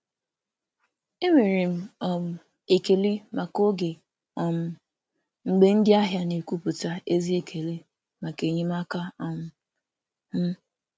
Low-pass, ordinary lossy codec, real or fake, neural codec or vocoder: none; none; real; none